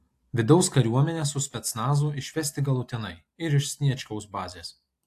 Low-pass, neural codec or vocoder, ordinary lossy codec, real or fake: 14.4 kHz; none; AAC, 64 kbps; real